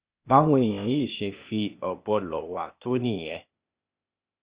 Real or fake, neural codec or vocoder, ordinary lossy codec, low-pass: fake; codec, 16 kHz, 0.8 kbps, ZipCodec; Opus, 64 kbps; 3.6 kHz